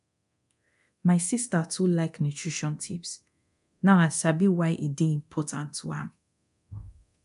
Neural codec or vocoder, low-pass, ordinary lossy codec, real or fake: codec, 24 kHz, 0.9 kbps, DualCodec; 10.8 kHz; MP3, 96 kbps; fake